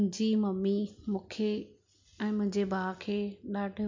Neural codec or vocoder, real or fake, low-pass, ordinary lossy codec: none; real; 7.2 kHz; MP3, 48 kbps